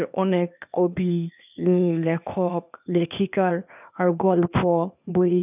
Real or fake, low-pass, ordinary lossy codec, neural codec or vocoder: fake; 3.6 kHz; none; codec, 16 kHz, 0.8 kbps, ZipCodec